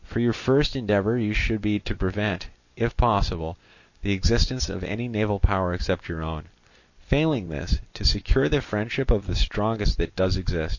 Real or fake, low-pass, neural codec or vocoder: real; 7.2 kHz; none